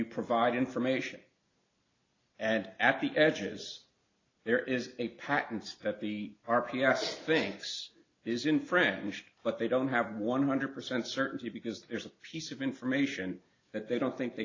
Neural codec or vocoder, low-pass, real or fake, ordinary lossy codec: none; 7.2 kHz; real; AAC, 32 kbps